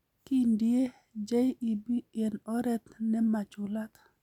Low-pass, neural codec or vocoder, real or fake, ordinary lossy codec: 19.8 kHz; none; real; none